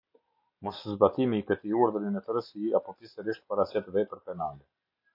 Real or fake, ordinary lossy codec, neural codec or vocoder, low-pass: real; MP3, 48 kbps; none; 5.4 kHz